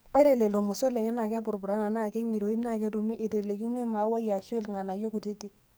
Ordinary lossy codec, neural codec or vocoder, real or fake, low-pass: none; codec, 44.1 kHz, 2.6 kbps, SNAC; fake; none